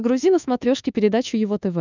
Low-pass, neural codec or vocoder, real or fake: 7.2 kHz; codec, 24 kHz, 1.2 kbps, DualCodec; fake